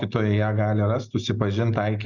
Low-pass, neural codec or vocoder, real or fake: 7.2 kHz; none; real